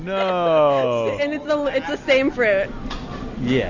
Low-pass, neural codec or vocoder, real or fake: 7.2 kHz; none; real